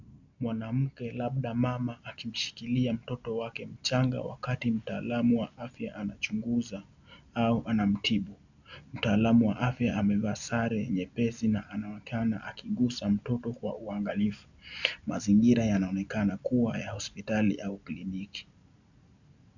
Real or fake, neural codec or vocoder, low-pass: real; none; 7.2 kHz